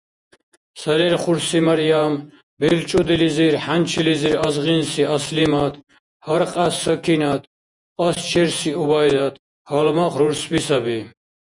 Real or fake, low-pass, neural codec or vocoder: fake; 10.8 kHz; vocoder, 48 kHz, 128 mel bands, Vocos